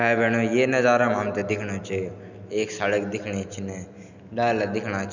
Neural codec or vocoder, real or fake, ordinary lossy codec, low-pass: none; real; none; 7.2 kHz